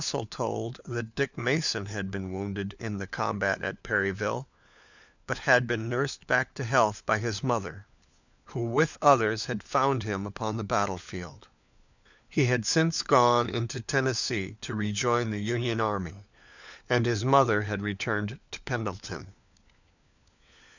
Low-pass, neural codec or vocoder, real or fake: 7.2 kHz; codec, 16 kHz, 4 kbps, FunCodec, trained on LibriTTS, 50 frames a second; fake